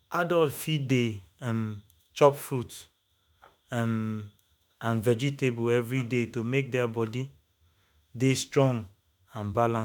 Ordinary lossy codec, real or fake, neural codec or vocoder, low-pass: none; fake; autoencoder, 48 kHz, 32 numbers a frame, DAC-VAE, trained on Japanese speech; none